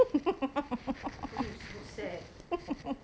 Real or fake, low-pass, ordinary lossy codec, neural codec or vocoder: real; none; none; none